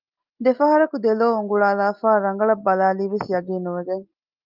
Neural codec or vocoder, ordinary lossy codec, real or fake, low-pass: none; Opus, 24 kbps; real; 5.4 kHz